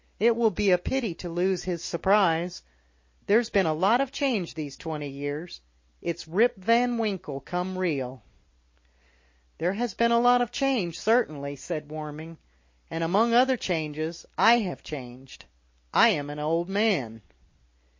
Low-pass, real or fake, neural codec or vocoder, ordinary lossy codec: 7.2 kHz; real; none; MP3, 32 kbps